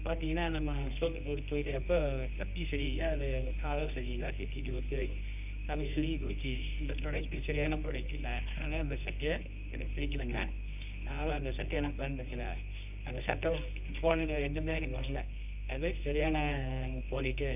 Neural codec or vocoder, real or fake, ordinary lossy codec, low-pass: codec, 24 kHz, 0.9 kbps, WavTokenizer, medium music audio release; fake; none; 3.6 kHz